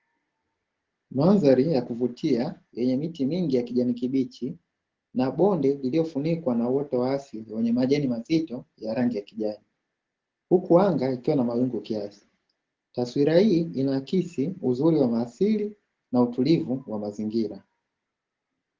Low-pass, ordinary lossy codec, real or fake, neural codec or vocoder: 7.2 kHz; Opus, 16 kbps; real; none